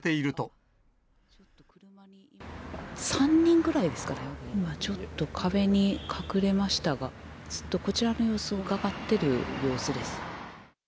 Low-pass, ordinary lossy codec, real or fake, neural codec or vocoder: none; none; real; none